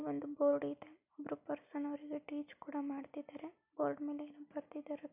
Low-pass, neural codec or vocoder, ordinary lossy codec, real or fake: 3.6 kHz; none; none; real